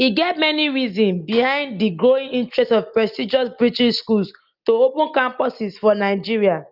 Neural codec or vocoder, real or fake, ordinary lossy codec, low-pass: none; real; Opus, 24 kbps; 5.4 kHz